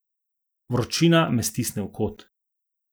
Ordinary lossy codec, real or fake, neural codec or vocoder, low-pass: none; real; none; none